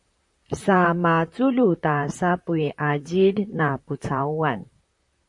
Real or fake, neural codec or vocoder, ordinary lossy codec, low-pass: fake; vocoder, 44.1 kHz, 128 mel bands, Pupu-Vocoder; MP3, 48 kbps; 10.8 kHz